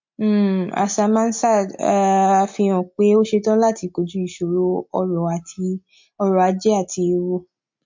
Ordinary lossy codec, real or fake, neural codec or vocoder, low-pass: MP3, 48 kbps; real; none; 7.2 kHz